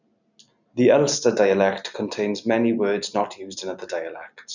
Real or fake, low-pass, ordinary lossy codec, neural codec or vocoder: real; 7.2 kHz; none; none